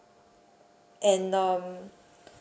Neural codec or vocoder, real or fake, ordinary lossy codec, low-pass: none; real; none; none